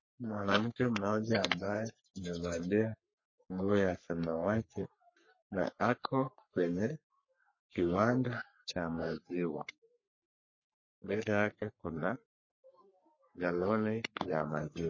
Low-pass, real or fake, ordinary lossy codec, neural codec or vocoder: 7.2 kHz; fake; MP3, 32 kbps; codec, 44.1 kHz, 3.4 kbps, Pupu-Codec